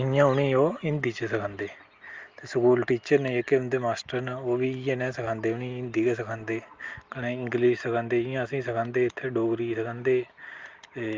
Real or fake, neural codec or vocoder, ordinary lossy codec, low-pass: real; none; Opus, 32 kbps; 7.2 kHz